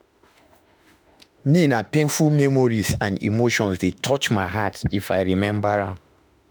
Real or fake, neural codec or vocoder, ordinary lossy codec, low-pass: fake; autoencoder, 48 kHz, 32 numbers a frame, DAC-VAE, trained on Japanese speech; none; none